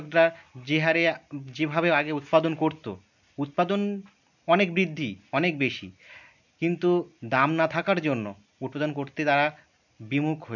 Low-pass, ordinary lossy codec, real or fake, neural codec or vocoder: 7.2 kHz; none; real; none